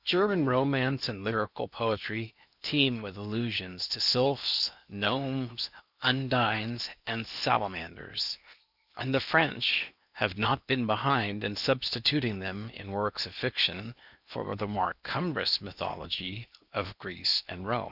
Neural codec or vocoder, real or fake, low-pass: codec, 16 kHz in and 24 kHz out, 0.8 kbps, FocalCodec, streaming, 65536 codes; fake; 5.4 kHz